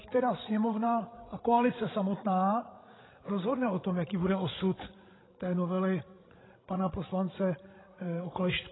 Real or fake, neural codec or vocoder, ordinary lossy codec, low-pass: fake; codec, 16 kHz, 16 kbps, FreqCodec, larger model; AAC, 16 kbps; 7.2 kHz